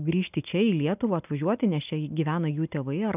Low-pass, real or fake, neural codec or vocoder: 3.6 kHz; real; none